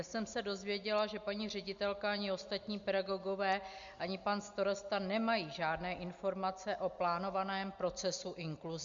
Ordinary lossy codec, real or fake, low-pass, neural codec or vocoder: Opus, 64 kbps; real; 7.2 kHz; none